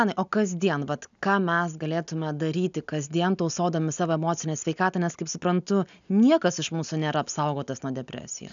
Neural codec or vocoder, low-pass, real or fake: none; 7.2 kHz; real